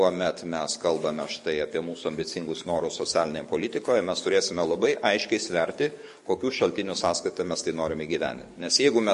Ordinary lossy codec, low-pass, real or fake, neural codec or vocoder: MP3, 48 kbps; 14.4 kHz; fake; codec, 44.1 kHz, 7.8 kbps, DAC